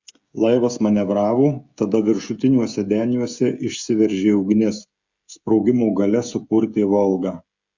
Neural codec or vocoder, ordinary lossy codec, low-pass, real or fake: codec, 16 kHz, 16 kbps, FreqCodec, smaller model; Opus, 64 kbps; 7.2 kHz; fake